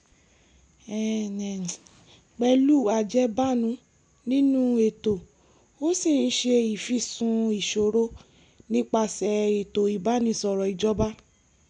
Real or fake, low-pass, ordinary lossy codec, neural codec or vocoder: real; 9.9 kHz; none; none